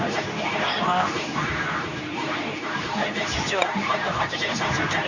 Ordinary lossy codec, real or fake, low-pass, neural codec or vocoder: none; fake; 7.2 kHz; codec, 24 kHz, 0.9 kbps, WavTokenizer, medium speech release version 2